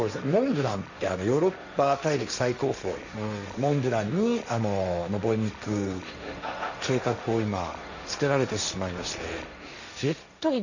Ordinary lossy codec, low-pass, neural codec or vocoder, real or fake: none; 7.2 kHz; codec, 16 kHz, 1.1 kbps, Voila-Tokenizer; fake